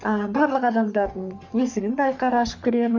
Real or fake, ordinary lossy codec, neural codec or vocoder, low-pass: fake; none; codec, 44.1 kHz, 3.4 kbps, Pupu-Codec; 7.2 kHz